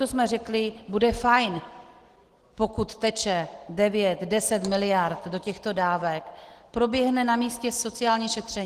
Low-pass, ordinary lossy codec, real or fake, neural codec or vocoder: 14.4 kHz; Opus, 16 kbps; real; none